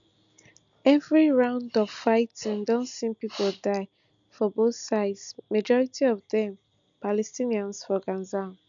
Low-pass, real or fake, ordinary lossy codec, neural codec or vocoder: 7.2 kHz; real; none; none